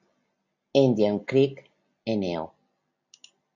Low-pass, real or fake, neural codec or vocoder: 7.2 kHz; real; none